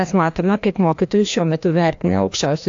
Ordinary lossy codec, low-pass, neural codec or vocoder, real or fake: AAC, 64 kbps; 7.2 kHz; codec, 16 kHz, 1 kbps, FreqCodec, larger model; fake